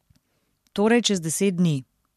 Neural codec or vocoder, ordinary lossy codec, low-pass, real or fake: none; MP3, 64 kbps; 14.4 kHz; real